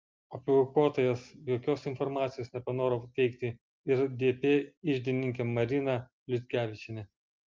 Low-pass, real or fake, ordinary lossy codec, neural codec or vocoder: 7.2 kHz; real; Opus, 32 kbps; none